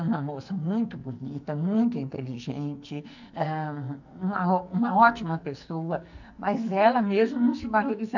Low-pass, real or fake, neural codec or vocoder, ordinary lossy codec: 7.2 kHz; fake; codec, 44.1 kHz, 2.6 kbps, SNAC; none